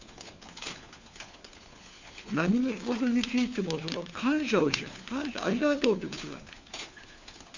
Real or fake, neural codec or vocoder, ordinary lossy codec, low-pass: fake; codec, 16 kHz, 4 kbps, FunCodec, trained on LibriTTS, 50 frames a second; Opus, 64 kbps; 7.2 kHz